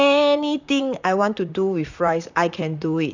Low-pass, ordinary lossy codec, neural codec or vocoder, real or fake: 7.2 kHz; none; vocoder, 44.1 kHz, 128 mel bands, Pupu-Vocoder; fake